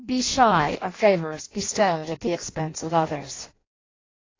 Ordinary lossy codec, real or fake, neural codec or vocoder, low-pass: AAC, 32 kbps; fake; codec, 16 kHz in and 24 kHz out, 0.6 kbps, FireRedTTS-2 codec; 7.2 kHz